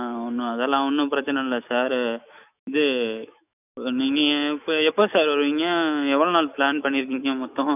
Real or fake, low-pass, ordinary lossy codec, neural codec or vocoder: fake; 3.6 kHz; none; autoencoder, 48 kHz, 128 numbers a frame, DAC-VAE, trained on Japanese speech